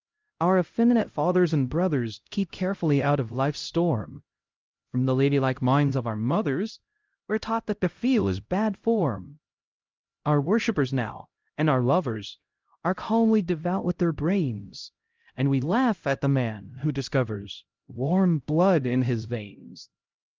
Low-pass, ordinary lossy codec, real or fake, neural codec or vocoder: 7.2 kHz; Opus, 24 kbps; fake; codec, 16 kHz, 0.5 kbps, X-Codec, HuBERT features, trained on LibriSpeech